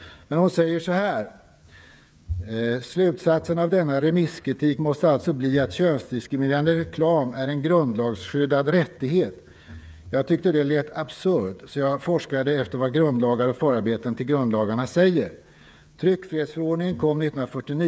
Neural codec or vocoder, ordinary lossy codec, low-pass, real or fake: codec, 16 kHz, 8 kbps, FreqCodec, smaller model; none; none; fake